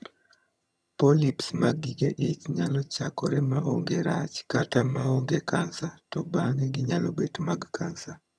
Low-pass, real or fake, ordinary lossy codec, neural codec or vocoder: none; fake; none; vocoder, 22.05 kHz, 80 mel bands, HiFi-GAN